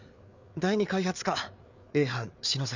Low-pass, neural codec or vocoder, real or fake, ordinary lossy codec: 7.2 kHz; codec, 16 kHz, 4 kbps, FreqCodec, larger model; fake; none